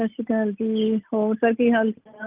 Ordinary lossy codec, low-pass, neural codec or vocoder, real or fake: Opus, 24 kbps; 3.6 kHz; none; real